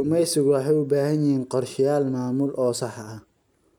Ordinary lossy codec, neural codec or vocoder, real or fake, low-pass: none; none; real; 19.8 kHz